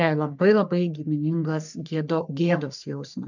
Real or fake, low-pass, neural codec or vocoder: fake; 7.2 kHz; codec, 44.1 kHz, 3.4 kbps, Pupu-Codec